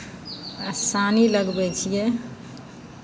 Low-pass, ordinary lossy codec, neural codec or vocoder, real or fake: none; none; none; real